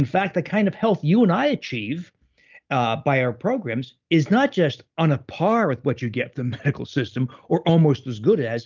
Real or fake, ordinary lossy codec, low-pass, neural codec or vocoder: real; Opus, 24 kbps; 7.2 kHz; none